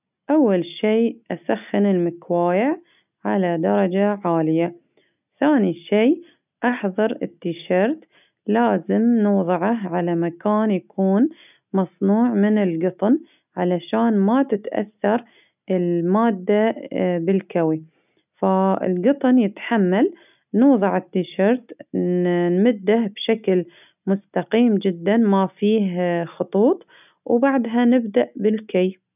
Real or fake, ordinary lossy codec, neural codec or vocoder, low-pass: real; none; none; 3.6 kHz